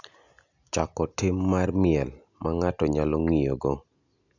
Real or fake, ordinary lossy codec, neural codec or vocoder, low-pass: real; none; none; 7.2 kHz